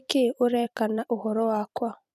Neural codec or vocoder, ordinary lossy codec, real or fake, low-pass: vocoder, 44.1 kHz, 128 mel bands every 256 samples, BigVGAN v2; none; fake; 14.4 kHz